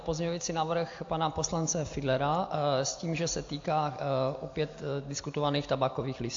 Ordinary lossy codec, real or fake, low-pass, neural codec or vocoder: AAC, 48 kbps; real; 7.2 kHz; none